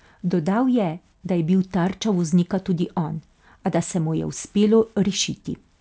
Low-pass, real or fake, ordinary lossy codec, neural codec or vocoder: none; real; none; none